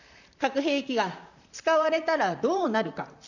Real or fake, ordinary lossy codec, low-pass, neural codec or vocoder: fake; none; 7.2 kHz; vocoder, 44.1 kHz, 128 mel bands, Pupu-Vocoder